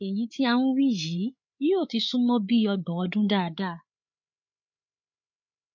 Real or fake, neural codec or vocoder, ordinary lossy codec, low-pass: fake; codec, 16 kHz, 8 kbps, FreqCodec, larger model; MP3, 48 kbps; 7.2 kHz